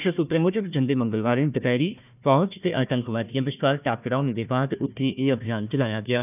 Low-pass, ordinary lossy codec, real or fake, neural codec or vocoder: 3.6 kHz; none; fake; codec, 16 kHz, 1 kbps, FunCodec, trained on Chinese and English, 50 frames a second